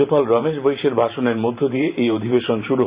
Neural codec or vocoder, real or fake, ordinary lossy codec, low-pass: vocoder, 44.1 kHz, 128 mel bands every 512 samples, BigVGAN v2; fake; Opus, 64 kbps; 3.6 kHz